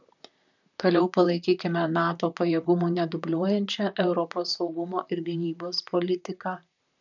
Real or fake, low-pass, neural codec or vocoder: fake; 7.2 kHz; vocoder, 44.1 kHz, 128 mel bands, Pupu-Vocoder